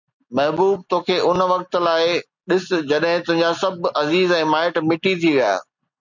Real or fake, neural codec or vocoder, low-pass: real; none; 7.2 kHz